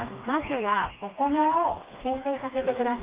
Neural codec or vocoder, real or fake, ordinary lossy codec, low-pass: codec, 16 kHz, 2 kbps, FreqCodec, smaller model; fake; Opus, 32 kbps; 3.6 kHz